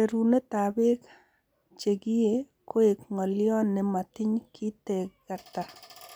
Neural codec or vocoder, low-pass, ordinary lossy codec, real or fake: none; none; none; real